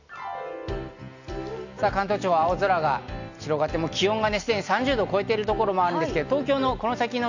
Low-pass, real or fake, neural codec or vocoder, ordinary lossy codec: 7.2 kHz; real; none; none